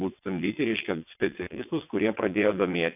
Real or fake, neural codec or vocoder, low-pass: fake; vocoder, 22.05 kHz, 80 mel bands, WaveNeXt; 3.6 kHz